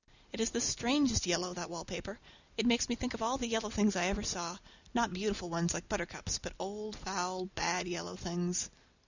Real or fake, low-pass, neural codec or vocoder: real; 7.2 kHz; none